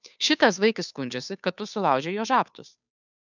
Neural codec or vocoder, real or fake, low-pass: codec, 16 kHz, 2 kbps, FunCodec, trained on Chinese and English, 25 frames a second; fake; 7.2 kHz